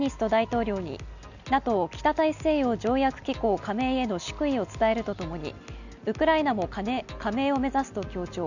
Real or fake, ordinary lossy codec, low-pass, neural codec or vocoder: real; none; 7.2 kHz; none